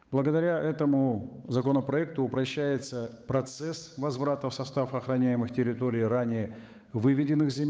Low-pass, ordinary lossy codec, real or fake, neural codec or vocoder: none; none; fake; codec, 16 kHz, 8 kbps, FunCodec, trained on Chinese and English, 25 frames a second